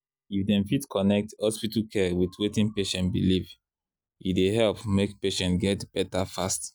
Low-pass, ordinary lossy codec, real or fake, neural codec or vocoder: none; none; real; none